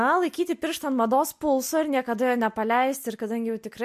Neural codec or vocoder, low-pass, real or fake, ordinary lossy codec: none; 14.4 kHz; real; MP3, 64 kbps